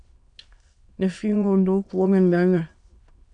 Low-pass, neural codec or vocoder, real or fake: 9.9 kHz; autoencoder, 22.05 kHz, a latent of 192 numbers a frame, VITS, trained on many speakers; fake